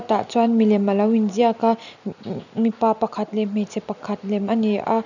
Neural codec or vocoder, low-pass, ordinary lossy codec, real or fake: none; 7.2 kHz; none; real